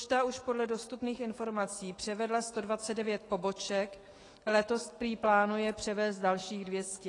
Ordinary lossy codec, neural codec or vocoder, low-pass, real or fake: AAC, 32 kbps; autoencoder, 48 kHz, 128 numbers a frame, DAC-VAE, trained on Japanese speech; 10.8 kHz; fake